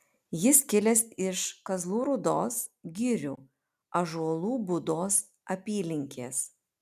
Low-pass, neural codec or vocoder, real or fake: 14.4 kHz; none; real